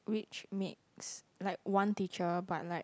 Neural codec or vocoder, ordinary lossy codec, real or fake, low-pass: none; none; real; none